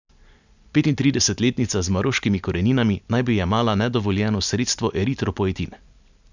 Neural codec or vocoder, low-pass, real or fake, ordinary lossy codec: none; 7.2 kHz; real; none